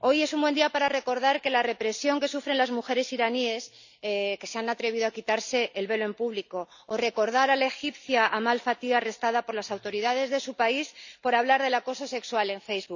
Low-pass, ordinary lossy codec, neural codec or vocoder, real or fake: 7.2 kHz; none; none; real